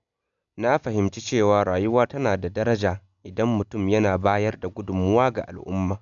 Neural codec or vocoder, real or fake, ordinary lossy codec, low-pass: none; real; none; 7.2 kHz